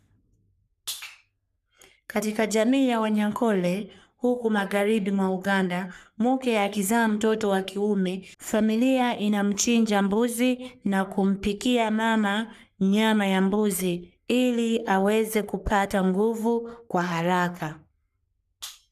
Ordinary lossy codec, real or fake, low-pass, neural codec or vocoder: none; fake; 14.4 kHz; codec, 44.1 kHz, 3.4 kbps, Pupu-Codec